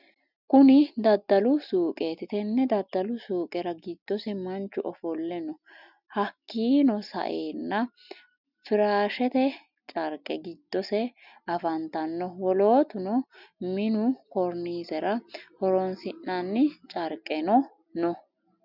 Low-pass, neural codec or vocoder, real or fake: 5.4 kHz; none; real